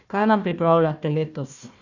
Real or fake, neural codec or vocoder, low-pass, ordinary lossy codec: fake; codec, 16 kHz, 1 kbps, FunCodec, trained on Chinese and English, 50 frames a second; 7.2 kHz; none